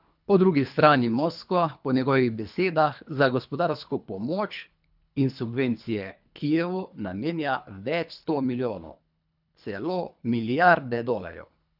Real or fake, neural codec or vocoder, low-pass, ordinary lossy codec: fake; codec, 24 kHz, 3 kbps, HILCodec; 5.4 kHz; none